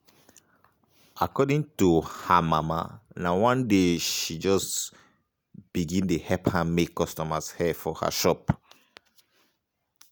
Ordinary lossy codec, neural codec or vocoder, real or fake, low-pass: none; none; real; none